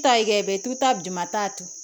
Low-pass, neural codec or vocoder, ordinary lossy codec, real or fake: none; none; none; real